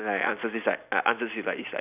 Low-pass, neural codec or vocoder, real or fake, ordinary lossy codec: 3.6 kHz; none; real; none